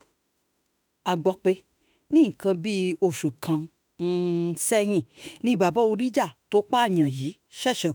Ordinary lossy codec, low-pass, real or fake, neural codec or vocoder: none; none; fake; autoencoder, 48 kHz, 32 numbers a frame, DAC-VAE, trained on Japanese speech